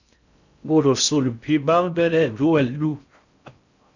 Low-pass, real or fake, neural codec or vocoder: 7.2 kHz; fake; codec, 16 kHz in and 24 kHz out, 0.6 kbps, FocalCodec, streaming, 2048 codes